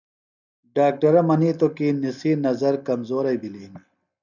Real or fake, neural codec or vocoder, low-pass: real; none; 7.2 kHz